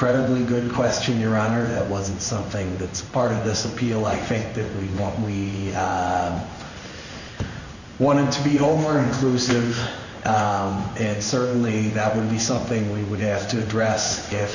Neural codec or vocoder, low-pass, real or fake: codec, 16 kHz in and 24 kHz out, 1 kbps, XY-Tokenizer; 7.2 kHz; fake